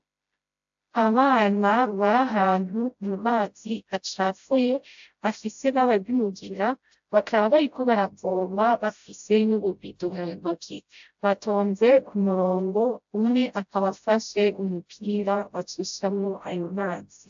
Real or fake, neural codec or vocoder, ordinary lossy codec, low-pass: fake; codec, 16 kHz, 0.5 kbps, FreqCodec, smaller model; AAC, 64 kbps; 7.2 kHz